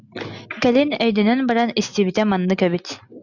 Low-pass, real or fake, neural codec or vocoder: 7.2 kHz; real; none